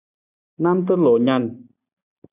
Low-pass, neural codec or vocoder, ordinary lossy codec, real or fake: 3.6 kHz; none; AAC, 32 kbps; real